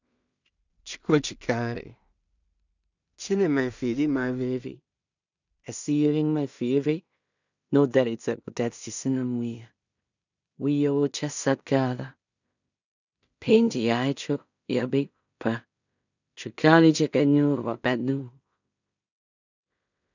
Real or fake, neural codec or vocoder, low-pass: fake; codec, 16 kHz in and 24 kHz out, 0.4 kbps, LongCat-Audio-Codec, two codebook decoder; 7.2 kHz